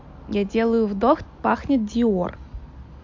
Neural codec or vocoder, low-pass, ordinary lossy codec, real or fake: none; 7.2 kHz; MP3, 48 kbps; real